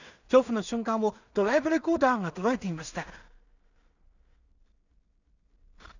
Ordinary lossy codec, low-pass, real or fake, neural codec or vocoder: none; 7.2 kHz; fake; codec, 16 kHz in and 24 kHz out, 0.4 kbps, LongCat-Audio-Codec, two codebook decoder